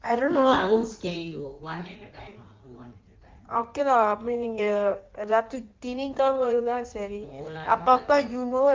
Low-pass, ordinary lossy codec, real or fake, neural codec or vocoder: 7.2 kHz; Opus, 24 kbps; fake; codec, 16 kHz in and 24 kHz out, 1.1 kbps, FireRedTTS-2 codec